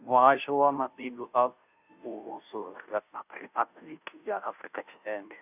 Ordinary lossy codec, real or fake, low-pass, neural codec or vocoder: none; fake; 3.6 kHz; codec, 16 kHz, 0.5 kbps, FunCodec, trained on Chinese and English, 25 frames a second